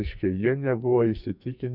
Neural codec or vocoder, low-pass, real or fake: codec, 44.1 kHz, 2.6 kbps, SNAC; 5.4 kHz; fake